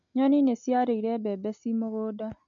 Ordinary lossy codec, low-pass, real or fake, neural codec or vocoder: MP3, 48 kbps; 7.2 kHz; real; none